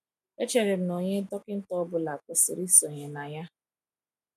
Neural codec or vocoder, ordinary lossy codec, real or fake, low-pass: none; none; real; 14.4 kHz